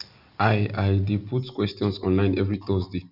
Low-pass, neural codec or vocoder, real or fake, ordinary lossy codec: 5.4 kHz; none; real; none